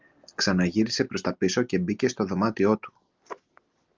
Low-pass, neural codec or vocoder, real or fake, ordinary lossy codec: 7.2 kHz; none; real; Opus, 32 kbps